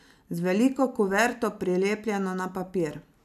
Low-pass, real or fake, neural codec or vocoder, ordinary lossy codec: 14.4 kHz; real; none; none